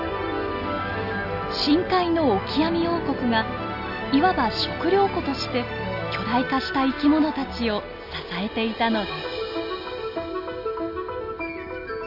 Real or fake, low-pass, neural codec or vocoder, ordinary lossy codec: real; 5.4 kHz; none; none